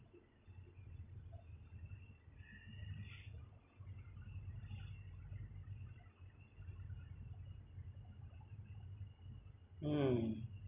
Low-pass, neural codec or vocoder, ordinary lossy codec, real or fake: 3.6 kHz; none; none; real